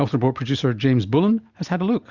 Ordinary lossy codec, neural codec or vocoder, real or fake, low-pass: Opus, 64 kbps; none; real; 7.2 kHz